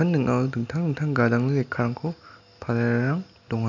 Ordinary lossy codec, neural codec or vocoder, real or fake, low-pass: none; none; real; 7.2 kHz